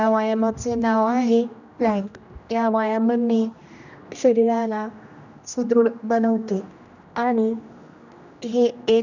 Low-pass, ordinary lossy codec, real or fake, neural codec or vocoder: 7.2 kHz; none; fake; codec, 16 kHz, 1 kbps, X-Codec, HuBERT features, trained on general audio